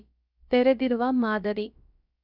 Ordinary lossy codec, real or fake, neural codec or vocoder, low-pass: none; fake; codec, 16 kHz, about 1 kbps, DyCAST, with the encoder's durations; 5.4 kHz